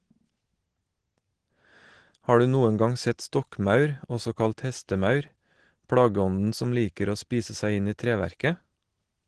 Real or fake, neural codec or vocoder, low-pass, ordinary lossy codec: real; none; 9.9 kHz; Opus, 16 kbps